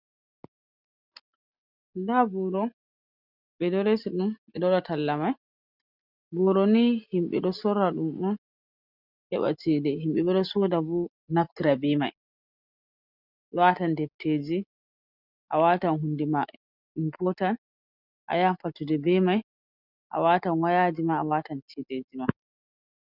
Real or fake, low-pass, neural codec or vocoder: real; 5.4 kHz; none